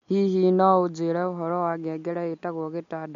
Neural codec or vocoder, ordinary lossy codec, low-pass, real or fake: none; MP3, 48 kbps; 7.2 kHz; real